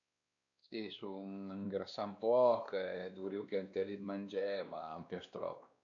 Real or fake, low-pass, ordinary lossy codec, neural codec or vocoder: fake; 7.2 kHz; none; codec, 16 kHz, 2 kbps, X-Codec, WavLM features, trained on Multilingual LibriSpeech